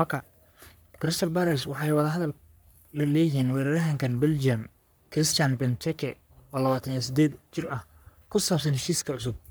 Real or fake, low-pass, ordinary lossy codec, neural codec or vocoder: fake; none; none; codec, 44.1 kHz, 3.4 kbps, Pupu-Codec